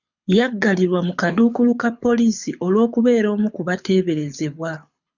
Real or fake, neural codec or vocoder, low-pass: fake; codec, 24 kHz, 6 kbps, HILCodec; 7.2 kHz